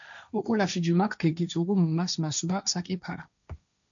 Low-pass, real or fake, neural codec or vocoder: 7.2 kHz; fake; codec, 16 kHz, 1.1 kbps, Voila-Tokenizer